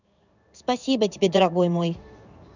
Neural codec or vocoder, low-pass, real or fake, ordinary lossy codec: codec, 16 kHz in and 24 kHz out, 1 kbps, XY-Tokenizer; 7.2 kHz; fake; none